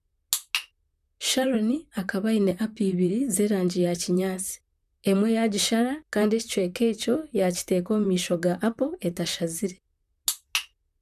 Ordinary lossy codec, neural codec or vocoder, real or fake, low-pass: none; vocoder, 44.1 kHz, 128 mel bands, Pupu-Vocoder; fake; 14.4 kHz